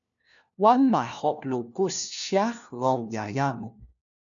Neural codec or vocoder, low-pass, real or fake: codec, 16 kHz, 1 kbps, FunCodec, trained on LibriTTS, 50 frames a second; 7.2 kHz; fake